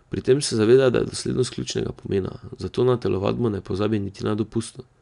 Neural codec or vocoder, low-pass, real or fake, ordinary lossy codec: none; 10.8 kHz; real; none